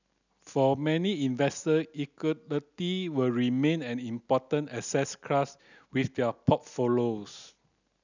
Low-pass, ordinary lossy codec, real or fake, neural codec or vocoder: 7.2 kHz; none; real; none